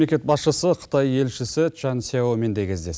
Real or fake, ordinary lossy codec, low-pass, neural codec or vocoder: real; none; none; none